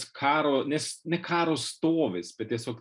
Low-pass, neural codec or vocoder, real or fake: 10.8 kHz; none; real